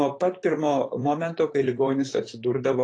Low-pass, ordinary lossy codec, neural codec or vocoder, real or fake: 9.9 kHz; AAC, 32 kbps; vocoder, 44.1 kHz, 128 mel bands, Pupu-Vocoder; fake